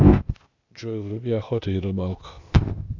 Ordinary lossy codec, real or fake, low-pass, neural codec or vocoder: Opus, 64 kbps; fake; 7.2 kHz; codec, 16 kHz, 0.8 kbps, ZipCodec